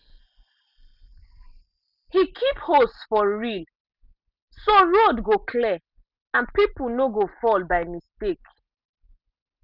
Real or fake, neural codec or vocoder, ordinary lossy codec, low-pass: real; none; none; 5.4 kHz